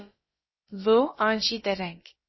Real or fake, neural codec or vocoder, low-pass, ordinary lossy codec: fake; codec, 16 kHz, about 1 kbps, DyCAST, with the encoder's durations; 7.2 kHz; MP3, 24 kbps